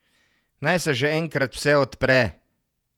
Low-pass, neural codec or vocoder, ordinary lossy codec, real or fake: 19.8 kHz; vocoder, 48 kHz, 128 mel bands, Vocos; none; fake